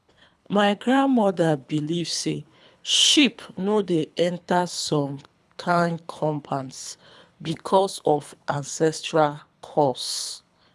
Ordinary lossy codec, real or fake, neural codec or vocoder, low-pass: none; fake; codec, 24 kHz, 3 kbps, HILCodec; none